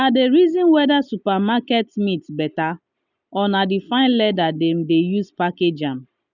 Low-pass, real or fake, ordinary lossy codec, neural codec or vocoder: 7.2 kHz; real; none; none